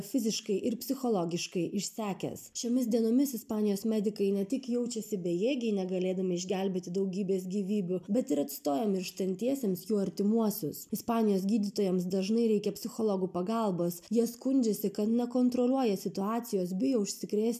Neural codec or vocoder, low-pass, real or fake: none; 14.4 kHz; real